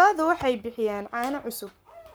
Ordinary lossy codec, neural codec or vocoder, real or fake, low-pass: none; codec, 44.1 kHz, 7.8 kbps, Pupu-Codec; fake; none